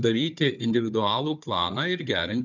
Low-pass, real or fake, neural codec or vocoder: 7.2 kHz; fake; codec, 16 kHz, 4 kbps, FunCodec, trained on Chinese and English, 50 frames a second